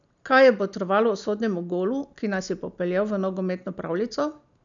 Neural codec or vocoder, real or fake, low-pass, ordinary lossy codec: none; real; 7.2 kHz; none